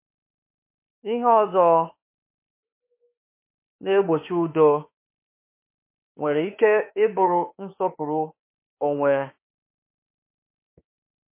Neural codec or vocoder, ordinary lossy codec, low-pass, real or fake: autoencoder, 48 kHz, 32 numbers a frame, DAC-VAE, trained on Japanese speech; MP3, 24 kbps; 3.6 kHz; fake